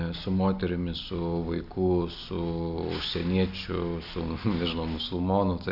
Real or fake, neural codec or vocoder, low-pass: real; none; 5.4 kHz